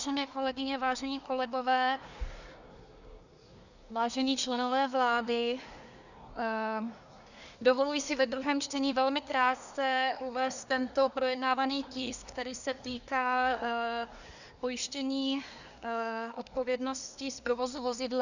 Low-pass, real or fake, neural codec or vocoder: 7.2 kHz; fake; codec, 24 kHz, 1 kbps, SNAC